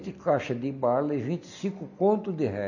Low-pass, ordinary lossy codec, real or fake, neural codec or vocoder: 7.2 kHz; none; real; none